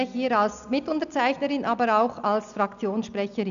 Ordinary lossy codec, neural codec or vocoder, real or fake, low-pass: none; none; real; 7.2 kHz